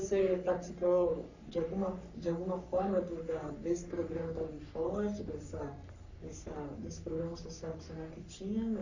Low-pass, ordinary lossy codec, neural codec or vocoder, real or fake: 7.2 kHz; none; codec, 44.1 kHz, 3.4 kbps, Pupu-Codec; fake